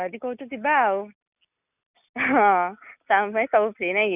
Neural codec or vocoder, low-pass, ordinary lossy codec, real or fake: none; 3.6 kHz; none; real